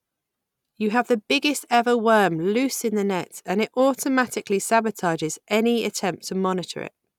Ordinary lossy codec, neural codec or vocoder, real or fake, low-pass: none; none; real; 19.8 kHz